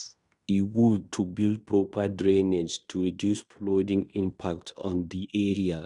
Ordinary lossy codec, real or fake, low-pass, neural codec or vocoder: Opus, 64 kbps; fake; 10.8 kHz; codec, 16 kHz in and 24 kHz out, 0.9 kbps, LongCat-Audio-Codec, fine tuned four codebook decoder